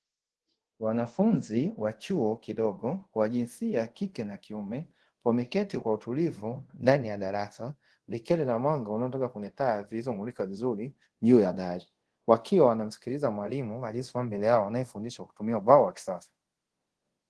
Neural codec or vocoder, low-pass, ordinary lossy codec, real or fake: codec, 24 kHz, 0.5 kbps, DualCodec; 10.8 kHz; Opus, 16 kbps; fake